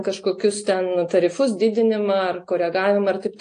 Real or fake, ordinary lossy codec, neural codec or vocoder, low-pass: real; AAC, 48 kbps; none; 9.9 kHz